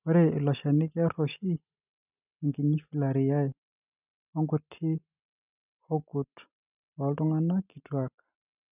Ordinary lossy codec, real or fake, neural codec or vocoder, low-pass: none; real; none; 3.6 kHz